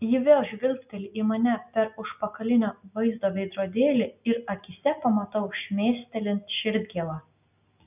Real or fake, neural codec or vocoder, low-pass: real; none; 3.6 kHz